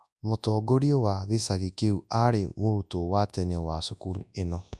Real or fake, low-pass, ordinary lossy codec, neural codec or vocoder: fake; none; none; codec, 24 kHz, 0.9 kbps, WavTokenizer, large speech release